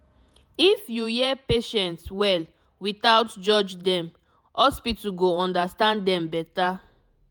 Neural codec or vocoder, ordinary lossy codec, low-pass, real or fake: vocoder, 48 kHz, 128 mel bands, Vocos; none; none; fake